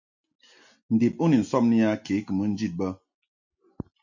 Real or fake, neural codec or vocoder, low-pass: real; none; 7.2 kHz